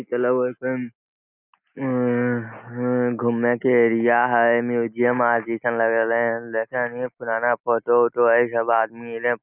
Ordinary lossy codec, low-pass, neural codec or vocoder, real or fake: none; 3.6 kHz; none; real